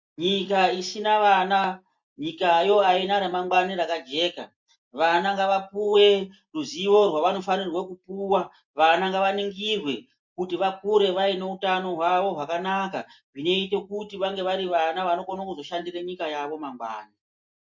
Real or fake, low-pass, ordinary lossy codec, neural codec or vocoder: real; 7.2 kHz; MP3, 48 kbps; none